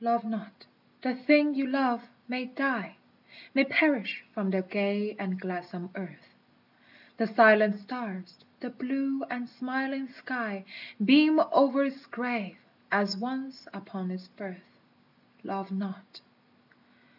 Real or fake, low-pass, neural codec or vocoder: real; 5.4 kHz; none